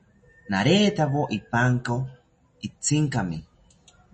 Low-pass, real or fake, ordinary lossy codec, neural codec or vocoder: 10.8 kHz; real; MP3, 32 kbps; none